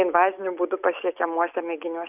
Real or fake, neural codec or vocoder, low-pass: real; none; 3.6 kHz